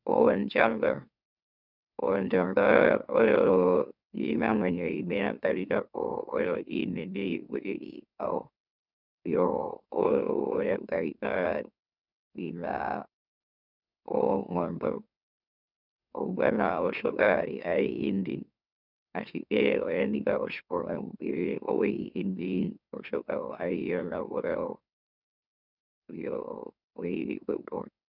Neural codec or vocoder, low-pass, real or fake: autoencoder, 44.1 kHz, a latent of 192 numbers a frame, MeloTTS; 5.4 kHz; fake